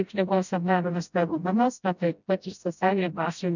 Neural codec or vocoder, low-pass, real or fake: codec, 16 kHz, 0.5 kbps, FreqCodec, smaller model; 7.2 kHz; fake